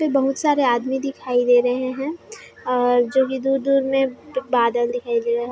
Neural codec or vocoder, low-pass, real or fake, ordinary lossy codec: none; none; real; none